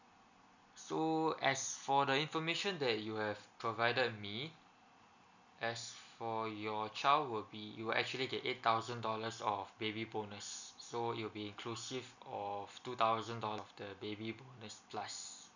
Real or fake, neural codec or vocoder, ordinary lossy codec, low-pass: real; none; none; 7.2 kHz